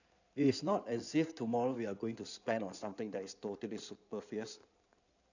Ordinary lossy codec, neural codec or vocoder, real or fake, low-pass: none; codec, 16 kHz in and 24 kHz out, 2.2 kbps, FireRedTTS-2 codec; fake; 7.2 kHz